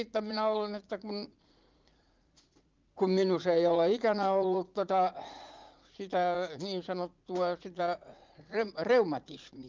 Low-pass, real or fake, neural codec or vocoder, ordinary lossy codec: 7.2 kHz; fake; vocoder, 44.1 kHz, 80 mel bands, Vocos; Opus, 24 kbps